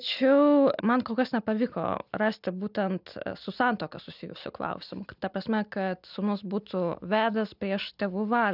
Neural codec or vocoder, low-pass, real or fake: none; 5.4 kHz; real